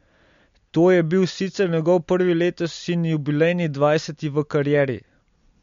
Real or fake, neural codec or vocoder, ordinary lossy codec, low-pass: real; none; MP3, 48 kbps; 7.2 kHz